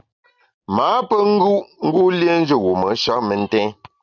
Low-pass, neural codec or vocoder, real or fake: 7.2 kHz; none; real